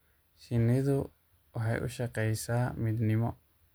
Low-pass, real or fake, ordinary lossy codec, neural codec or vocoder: none; real; none; none